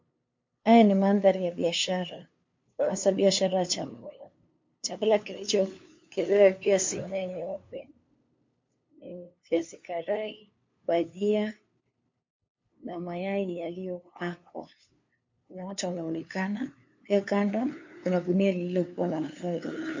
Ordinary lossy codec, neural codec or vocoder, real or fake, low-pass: MP3, 48 kbps; codec, 16 kHz, 2 kbps, FunCodec, trained on LibriTTS, 25 frames a second; fake; 7.2 kHz